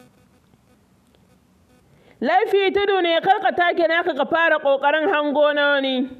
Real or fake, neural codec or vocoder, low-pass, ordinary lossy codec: real; none; 14.4 kHz; none